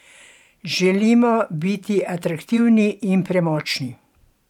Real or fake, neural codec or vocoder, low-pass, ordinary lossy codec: fake; vocoder, 44.1 kHz, 128 mel bands every 512 samples, BigVGAN v2; 19.8 kHz; none